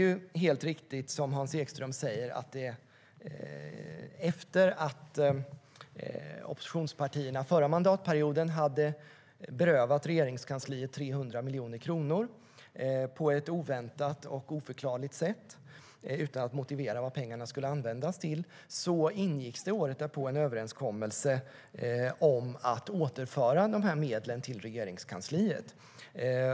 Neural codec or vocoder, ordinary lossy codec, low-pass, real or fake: none; none; none; real